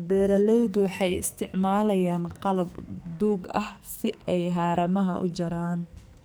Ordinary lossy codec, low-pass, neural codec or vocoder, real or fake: none; none; codec, 44.1 kHz, 2.6 kbps, SNAC; fake